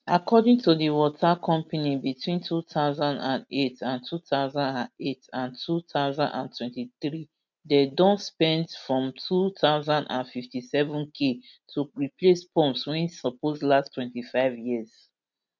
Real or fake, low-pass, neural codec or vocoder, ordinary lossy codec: real; 7.2 kHz; none; none